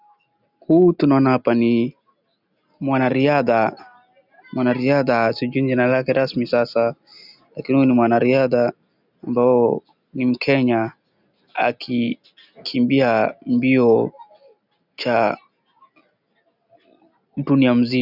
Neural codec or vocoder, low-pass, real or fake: none; 5.4 kHz; real